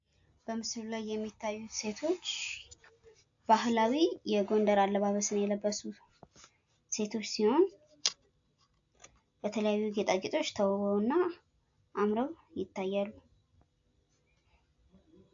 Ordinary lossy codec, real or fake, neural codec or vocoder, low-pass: AAC, 64 kbps; real; none; 7.2 kHz